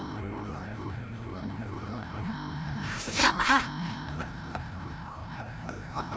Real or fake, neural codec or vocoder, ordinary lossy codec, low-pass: fake; codec, 16 kHz, 0.5 kbps, FreqCodec, larger model; none; none